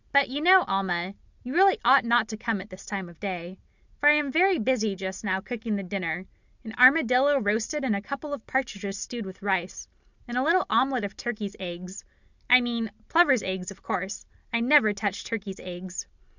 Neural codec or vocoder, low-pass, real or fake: none; 7.2 kHz; real